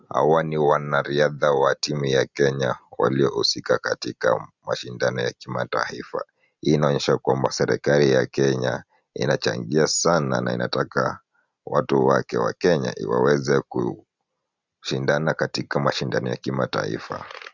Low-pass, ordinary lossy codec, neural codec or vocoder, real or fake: 7.2 kHz; Opus, 64 kbps; none; real